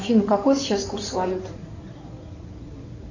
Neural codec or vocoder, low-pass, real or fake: codec, 16 kHz in and 24 kHz out, 2.2 kbps, FireRedTTS-2 codec; 7.2 kHz; fake